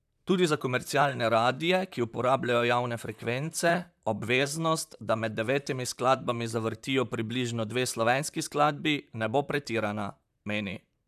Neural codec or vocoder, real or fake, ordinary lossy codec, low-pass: vocoder, 44.1 kHz, 128 mel bands, Pupu-Vocoder; fake; none; 14.4 kHz